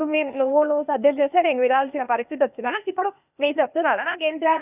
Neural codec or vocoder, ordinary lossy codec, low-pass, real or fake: codec, 16 kHz, 0.8 kbps, ZipCodec; none; 3.6 kHz; fake